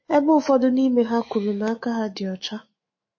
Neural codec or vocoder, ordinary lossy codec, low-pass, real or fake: autoencoder, 48 kHz, 128 numbers a frame, DAC-VAE, trained on Japanese speech; MP3, 32 kbps; 7.2 kHz; fake